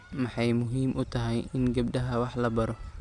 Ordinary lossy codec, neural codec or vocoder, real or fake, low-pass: none; none; real; 10.8 kHz